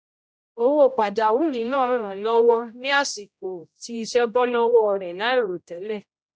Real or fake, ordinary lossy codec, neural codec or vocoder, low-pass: fake; none; codec, 16 kHz, 0.5 kbps, X-Codec, HuBERT features, trained on general audio; none